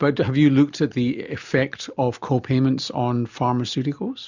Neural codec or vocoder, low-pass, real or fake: none; 7.2 kHz; real